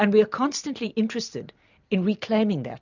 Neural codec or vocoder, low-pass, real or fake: none; 7.2 kHz; real